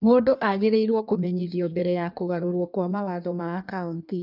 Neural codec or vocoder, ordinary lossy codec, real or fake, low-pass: codec, 16 kHz in and 24 kHz out, 1.1 kbps, FireRedTTS-2 codec; none; fake; 5.4 kHz